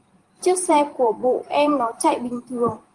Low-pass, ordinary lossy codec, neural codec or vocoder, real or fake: 10.8 kHz; Opus, 24 kbps; vocoder, 44.1 kHz, 128 mel bands every 512 samples, BigVGAN v2; fake